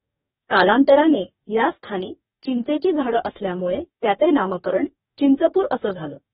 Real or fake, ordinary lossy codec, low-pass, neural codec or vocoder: fake; AAC, 16 kbps; 19.8 kHz; codec, 44.1 kHz, 2.6 kbps, DAC